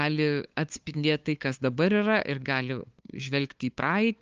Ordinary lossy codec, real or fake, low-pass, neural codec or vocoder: Opus, 24 kbps; fake; 7.2 kHz; codec, 16 kHz, 2 kbps, FunCodec, trained on LibriTTS, 25 frames a second